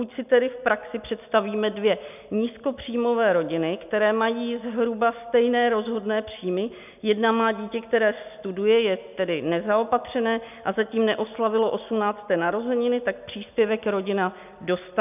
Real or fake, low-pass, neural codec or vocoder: real; 3.6 kHz; none